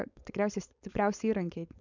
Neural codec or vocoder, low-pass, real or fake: codec, 16 kHz, 4.8 kbps, FACodec; 7.2 kHz; fake